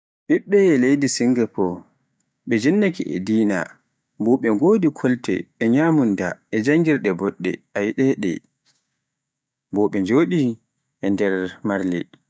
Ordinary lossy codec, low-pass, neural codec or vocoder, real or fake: none; none; codec, 16 kHz, 6 kbps, DAC; fake